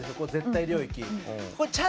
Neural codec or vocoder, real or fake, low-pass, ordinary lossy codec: none; real; none; none